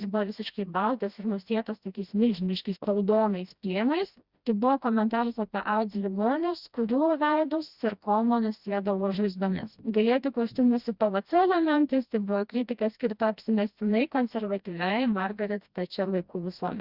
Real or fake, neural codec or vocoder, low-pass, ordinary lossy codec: fake; codec, 16 kHz, 1 kbps, FreqCodec, smaller model; 5.4 kHz; Opus, 64 kbps